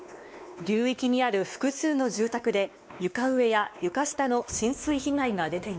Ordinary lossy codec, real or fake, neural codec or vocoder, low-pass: none; fake; codec, 16 kHz, 2 kbps, X-Codec, WavLM features, trained on Multilingual LibriSpeech; none